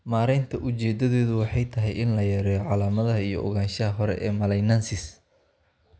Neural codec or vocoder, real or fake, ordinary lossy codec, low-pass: none; real; none; none